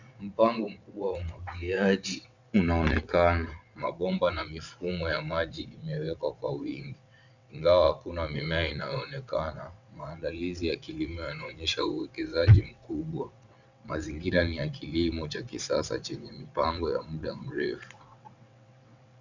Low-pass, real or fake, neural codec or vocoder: 7.2 kHz; fake; vocoder, 22.05 kHz, 80 mel bands, WaveNeXt